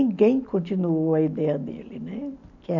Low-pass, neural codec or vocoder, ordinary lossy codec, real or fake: 7.2 kHz; none; none; real